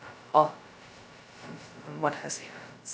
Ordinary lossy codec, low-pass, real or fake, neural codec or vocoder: none; none; fake; codec, 16 kHz, 0.2 kbps, FocalCodec